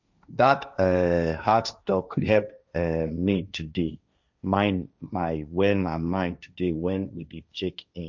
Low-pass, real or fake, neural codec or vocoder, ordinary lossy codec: 7.2 kHz; fake; codec, 16 kHz, 1.1 kbps, Voila-Tokenizer; none